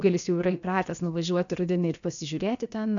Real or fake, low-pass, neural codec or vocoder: fake; 7.2 kHz; codec, 16 kHz, about 1 kbps, DyCAST, with the encoder's durations